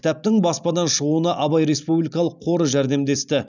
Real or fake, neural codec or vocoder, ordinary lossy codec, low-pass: real; none; none; 7.2 kHz